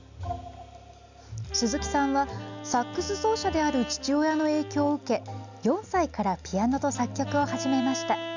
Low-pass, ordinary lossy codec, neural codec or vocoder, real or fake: 7.2 kHz; none; none; real